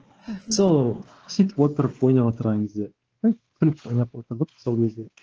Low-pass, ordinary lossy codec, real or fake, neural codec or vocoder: 7.2 kHz; Opus, 16 kbps; fake; codec, 16 kHz, 2 kbps, X-Codec, WavLM features, trained on Multilingual LibriSpeech